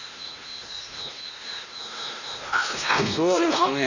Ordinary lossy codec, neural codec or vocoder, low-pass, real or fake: none; codec, 16 kHz, 1 kbps, X-Codec, WavLM features, trained on Multilingual LibriSpeech; 7.2 kHz; fake